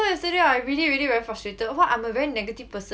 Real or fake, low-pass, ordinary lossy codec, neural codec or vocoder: real; none; none; none